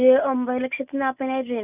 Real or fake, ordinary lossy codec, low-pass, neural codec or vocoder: real; none; 3.6 kHz; none